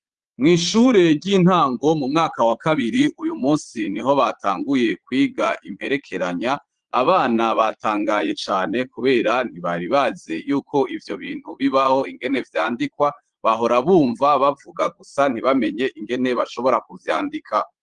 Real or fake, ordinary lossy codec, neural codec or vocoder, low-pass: fake; Opus, 24 kbps; vocoder, 22.05 kHz, 80 mel bands, Vocos; 9.9 kHz